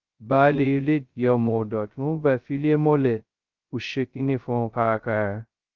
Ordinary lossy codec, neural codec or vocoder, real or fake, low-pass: Opus, 24 kbps; codec, 16 kHz, 0.2 kbps, FocalCodec; fake; 7.2 kHz